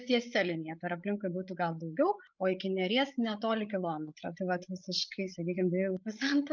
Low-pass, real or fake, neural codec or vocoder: 7.2 kHz; fake; codec, 16 kHz, 8 kbps, FreqCodec, larger model